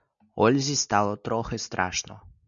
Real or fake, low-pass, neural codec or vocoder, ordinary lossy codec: real; 7.2 kHz; none; MP3, 96 kbps